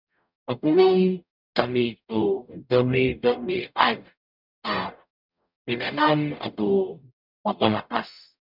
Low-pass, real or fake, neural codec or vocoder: 5.4 kHz; fake; codec, 44.1 kHz, 0.9 kbps, DAC